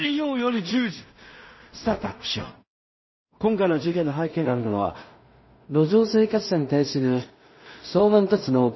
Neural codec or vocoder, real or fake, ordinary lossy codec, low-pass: codec, 16 kHz in and 24 kHz out, 0.4 kbps, LongCat-Audio-Codec, two codebook decoder; fake; MP3, 24 kbps; 7.2 kHz